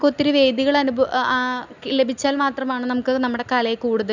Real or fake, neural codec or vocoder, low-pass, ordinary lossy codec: real; none; 7.2 kHz; none